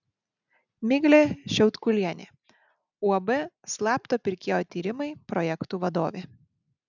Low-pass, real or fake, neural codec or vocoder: 7.2 kHz; real; none